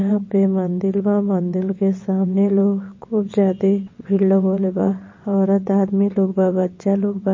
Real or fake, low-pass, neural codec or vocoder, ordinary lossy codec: fake; 7.2 kHz; vocoder, 22.05 kHz, 80 mel bands, WaveNeXt; MP3, 32 kbps